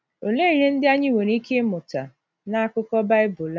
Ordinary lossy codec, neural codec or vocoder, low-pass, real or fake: none; none; none; real